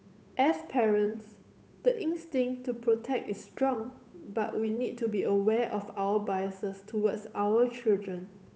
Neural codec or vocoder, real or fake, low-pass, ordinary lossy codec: codec, 16 kHz, 8 kbps, FunCodec, trained on Chinese and English, 25 frames a second; fake; none; none